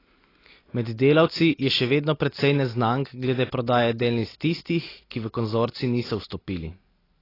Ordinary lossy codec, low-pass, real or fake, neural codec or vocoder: AAC, 24 kbps; 5.4 kHz; real; none